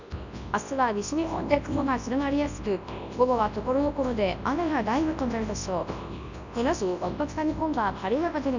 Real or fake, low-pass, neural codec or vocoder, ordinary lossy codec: fake; 7.2 kHz; codec, 24 kHz, 0.9 kbps, WavTokenizer, large speech release; none